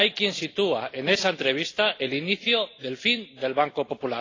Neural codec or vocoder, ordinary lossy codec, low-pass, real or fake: none; AAC, 32 kbps; 7.2 kHz; real